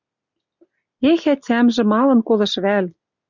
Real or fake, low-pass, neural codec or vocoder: real; 7.2 kHz; none